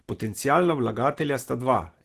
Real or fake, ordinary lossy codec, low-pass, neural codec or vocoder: real; Opus, 16 kbps; 14.4 kHz; none